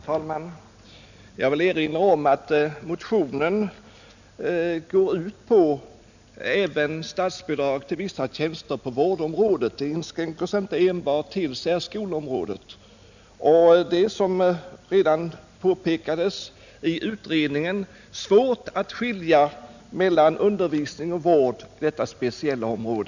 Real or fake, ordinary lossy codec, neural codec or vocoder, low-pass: real; none; none; 7.2 kHz